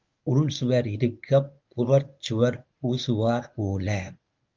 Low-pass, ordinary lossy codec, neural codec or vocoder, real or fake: 7.2 kHz; Opus, 24 kbps; codec, 24 kHz, 0.9 kbps, WavTokenizer, medium speech release version 1; fake